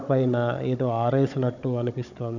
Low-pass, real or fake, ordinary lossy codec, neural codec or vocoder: 7.2 kHz; fake; none; codec, 16 kHz, 16 kbps, FunCodec, trained on Chinese and English, 50 frames a second